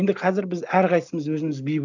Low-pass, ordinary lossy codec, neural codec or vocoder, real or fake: 7.2 kHz; none; none; real